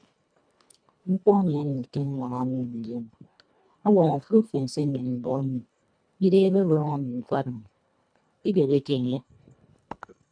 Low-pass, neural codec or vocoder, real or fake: 9.9 kHz; codec, 24 kHz, 1.5 kbps, HILCodec; fake